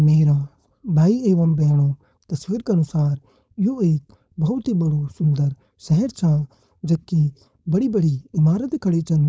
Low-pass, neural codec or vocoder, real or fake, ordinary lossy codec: none; codec, 16 kHz, 4.8 kbps, FACodec; fake; none